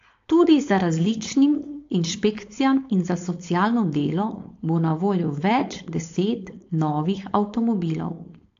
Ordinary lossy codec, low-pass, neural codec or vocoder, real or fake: AAC, 48 kbps; 7.2 kHz; codec, 16 kHz, 4.8 kbps, FACodec; fake